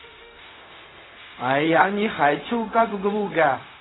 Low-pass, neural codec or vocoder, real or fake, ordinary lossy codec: 7.2 kHz; codec, 16 kHz, 0.4 kbps, LongCat-Audio-Codec; fake; AAC, 16 kbps